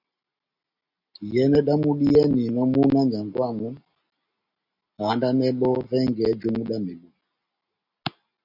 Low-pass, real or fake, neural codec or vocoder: 5.4 kHz; real; none